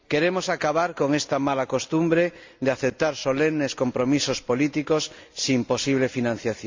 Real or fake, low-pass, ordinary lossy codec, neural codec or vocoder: real; 7.2 kHz; MP3, 64 kbps; none